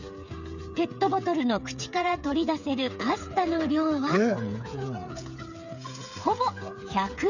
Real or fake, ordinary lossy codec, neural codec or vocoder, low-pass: fake; none; codec, 16 kHz, 8 kbps, FreqCodec, smaller model; 7.2 kHz